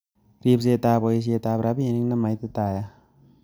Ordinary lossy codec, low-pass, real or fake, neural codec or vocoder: none; none; real; none